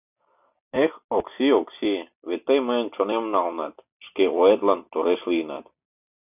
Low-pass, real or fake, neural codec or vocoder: 3.6 kHz; real; none